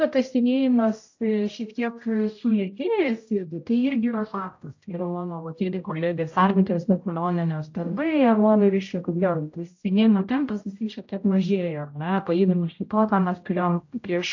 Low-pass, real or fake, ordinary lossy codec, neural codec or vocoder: 7.2 kHz; fake; AAC, 48 kbps; codec, 16 kHz, 0.5 kbps, X-Codec, HuBERT features, trained on general audio